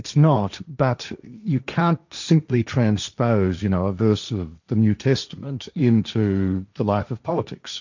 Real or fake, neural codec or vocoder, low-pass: fake; codec, 16 kHz, 1.1 kbps, Voila-Tokenizer; 7.2 kHz